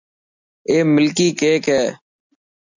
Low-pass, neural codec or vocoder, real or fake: 7.2 kHz; none; real